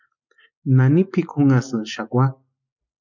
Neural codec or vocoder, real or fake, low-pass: none; real; 7.2 kHz